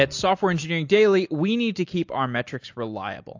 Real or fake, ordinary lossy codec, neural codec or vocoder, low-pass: real; AAC, 48 kbps; none; 7.2 kHz